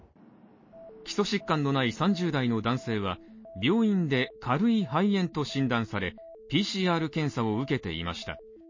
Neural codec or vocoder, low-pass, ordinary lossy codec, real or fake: none; 7.2 kHz; MP3, 32 kbps; real